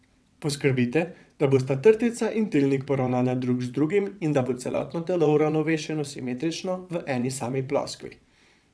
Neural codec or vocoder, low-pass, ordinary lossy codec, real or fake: vocoder, 22.05 kHz, 80 mel bands, WaveNeXt; none; none; fake